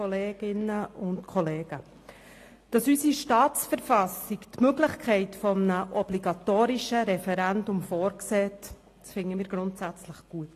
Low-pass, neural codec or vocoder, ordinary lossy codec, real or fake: 14.4 kHz; none; AAC, 48 kbps; real